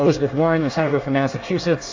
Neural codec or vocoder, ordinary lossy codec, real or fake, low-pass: codec, 24 kHz, 1 kbps, SNAC; AAC, 48 kbps; fake; 7.2 kHz